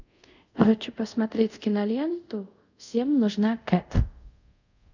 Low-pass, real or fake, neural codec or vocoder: 7.2 kHz; fake; codec, 24 kHz, 0.5 kbps, DualCodec